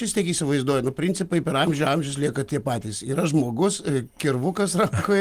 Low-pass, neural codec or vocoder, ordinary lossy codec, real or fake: 14.4 kHz; none; Opus, 24 kbps; real